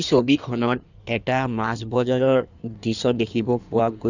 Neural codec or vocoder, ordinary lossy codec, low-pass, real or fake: codec, 16 kHz in and 24 kHz out, 1.1 kbps, FireRedTTS-2 codec; none; 7.2 kHz; fake